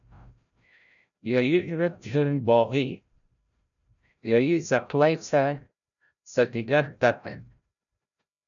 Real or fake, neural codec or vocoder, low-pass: fake; codec, 16 kHz, 0.5 kbps, FreqCodec, larger model; 7.2 kHz